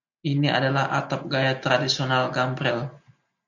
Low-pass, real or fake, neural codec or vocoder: 7.2 kHz; real; none